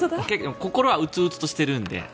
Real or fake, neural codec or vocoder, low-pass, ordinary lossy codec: real; none; none; none